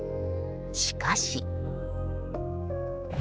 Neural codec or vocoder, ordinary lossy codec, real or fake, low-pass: codec, 16 kHz, 4 kbps, X-Codec, HuBERT features, trained on general audio; none; fake; none